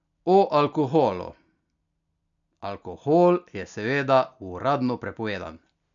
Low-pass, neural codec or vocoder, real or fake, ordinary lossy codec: 7.2 kHz; none; real; none